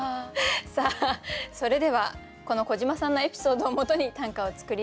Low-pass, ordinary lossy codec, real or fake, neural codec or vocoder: none; none; real; none